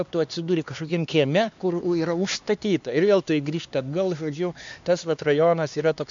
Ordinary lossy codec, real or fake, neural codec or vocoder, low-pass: MP3, 64 kbps; fake; codec, 16 kHz, 2 kbps, X-Codec, HuBERT features, trained on LibriSpeech; 7.2 kHz